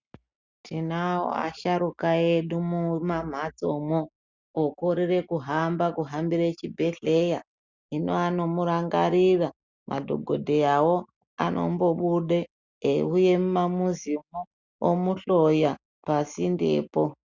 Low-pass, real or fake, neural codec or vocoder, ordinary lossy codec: 7.2 kHz; real; none; Opus, 64 kbps